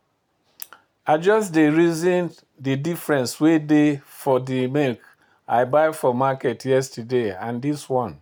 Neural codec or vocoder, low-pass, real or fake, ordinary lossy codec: none; none; real; none